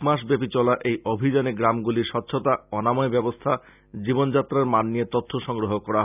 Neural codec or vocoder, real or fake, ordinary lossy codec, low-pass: none; real; none; 3.6 kHz